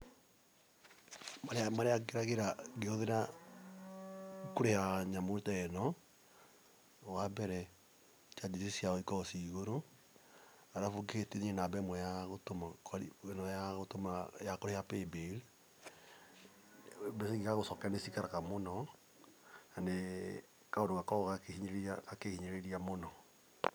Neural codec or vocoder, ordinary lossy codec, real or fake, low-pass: none; none; real; none